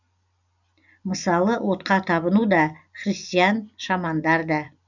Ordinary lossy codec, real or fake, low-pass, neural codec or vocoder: none; real; 7.2 kHz; none